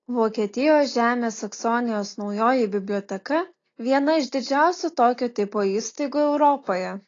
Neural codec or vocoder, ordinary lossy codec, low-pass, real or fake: none; AAC, 32 kbps; 7.2 kHz; real